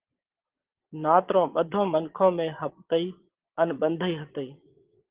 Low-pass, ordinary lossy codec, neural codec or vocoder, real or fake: 3.6 kHz; Opus, 16 kbps; vocoder, 22.05 kHz, 80 mel bands, Vocos; fake